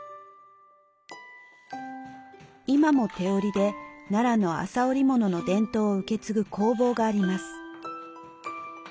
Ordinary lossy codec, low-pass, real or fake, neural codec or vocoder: none; none; real; none